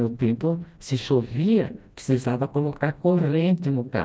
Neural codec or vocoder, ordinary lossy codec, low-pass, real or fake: codec, 16 kHz, 1 kbps, FreqCodec, smaller model; none; none; fake